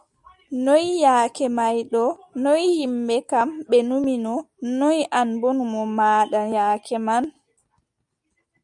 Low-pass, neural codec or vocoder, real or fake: 10.8 kHz; none; real